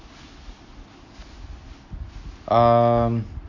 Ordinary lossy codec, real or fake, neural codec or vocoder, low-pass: none; real; none; 7.2 kHz